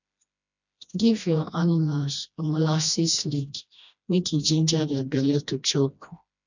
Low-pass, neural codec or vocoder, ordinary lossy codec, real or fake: 7.2 kHz; codec, 16 kHz, 1 kbps, FreqCodec, smaller model; none; fake